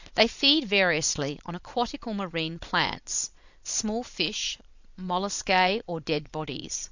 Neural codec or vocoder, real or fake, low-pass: vocoder, 22.05 kHz, 80 mel bands, Vocos; fake; 7.2 kHz